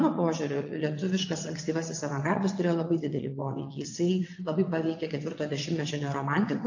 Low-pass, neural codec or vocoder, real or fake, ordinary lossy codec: 7.2 kHz; vocoder, 22.05 kHz, 80 mel bands, WaveNeXt; fake; AAC, 48 kbps